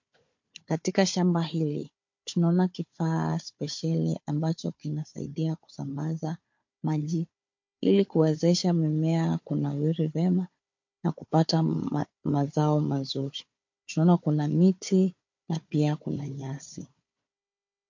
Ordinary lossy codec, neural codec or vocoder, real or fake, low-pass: MP3, 48 kbps; codec, 16 kHz, 4 kbps, FunCodec, trained on Chinese and English, 50 frames a second; fake; 7.2 kHz